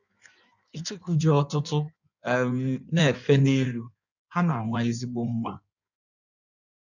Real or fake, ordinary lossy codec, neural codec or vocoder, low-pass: fake; none; codec, 16 kHz in and 24 kHz out, 1.1 kbps, FireRedTTS-2 codec; 7.2 kHz